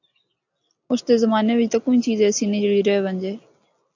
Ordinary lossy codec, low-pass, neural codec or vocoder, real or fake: AAC, 48 kbps; 7.2 kHz; none; real